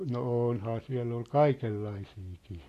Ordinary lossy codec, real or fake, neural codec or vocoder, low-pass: AAC, 48 kbps; real; none; 14.4 kHz